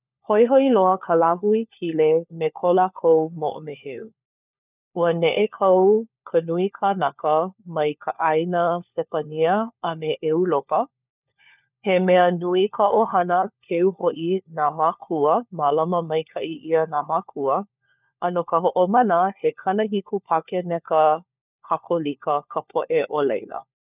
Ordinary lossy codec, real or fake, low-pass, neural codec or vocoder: none; fake; 3.6 kHz; codec, 16 kHz, 4 kbps, FunCodec, trained on LibriTTS, 50 frames a second